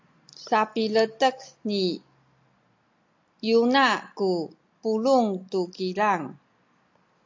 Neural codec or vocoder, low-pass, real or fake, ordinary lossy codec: none; 7.2 kHz; real; MP3, 48 kbps